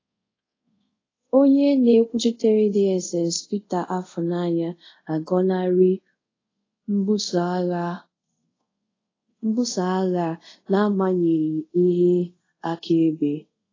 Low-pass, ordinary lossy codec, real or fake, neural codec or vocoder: 7.2 kHz; AAC, 32 kbps; fake; codec, 24 kHz, 0.5 kbps, DualCodec